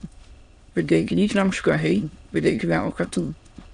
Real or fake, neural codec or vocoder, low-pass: fake; autoencoder, 22.05 kHz, a latent of 192 numbers a frame, VITS, trained on many speakers; 9.9 kHz